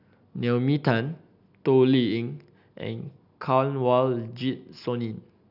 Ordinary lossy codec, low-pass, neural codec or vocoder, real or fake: none; 5.4 kHz; none; real